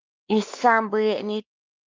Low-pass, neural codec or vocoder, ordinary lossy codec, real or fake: 7.2 kHz; codec, 16 kHz, 2 kbps, X-Codec, WavLM features, trained on Multilingual LibriSpeech; Opus, 24 kbps; fake